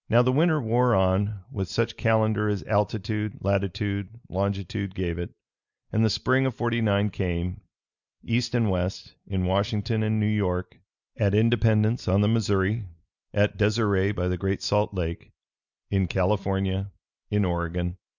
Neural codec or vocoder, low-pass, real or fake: none; 7.2 kHz; real